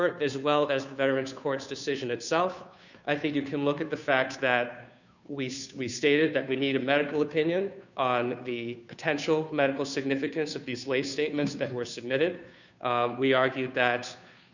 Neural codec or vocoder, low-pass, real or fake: codec, 16 kHz, 2 kbps, FunCodec, trained on Chinese and English, 25 frames a second; 7.2 kHz; fake